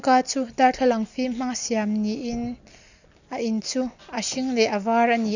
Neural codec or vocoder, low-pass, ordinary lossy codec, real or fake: none; 7.2 kHz; none; real